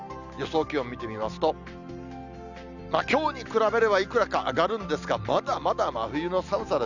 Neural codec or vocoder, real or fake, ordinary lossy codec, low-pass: none; real; none; 7.2 kHz